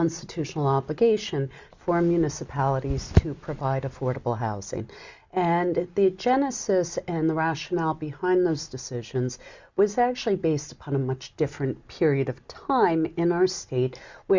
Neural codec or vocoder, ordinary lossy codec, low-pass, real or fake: none; Opus, 64 kbps; 7.2 kHz; real